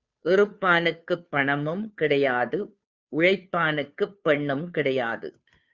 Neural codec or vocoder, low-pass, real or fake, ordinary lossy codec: codec, 16 kHz, 2 kbps, FunCodec, trained on Chinese and English, 25 frames a second; 7.2 kHz; fake; Opus, 64 kbps